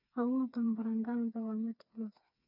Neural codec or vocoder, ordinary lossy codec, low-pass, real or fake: codec, 16 kHz, 4 kbps, FreqCodec, smaller model; none; 5.4 kHz; fake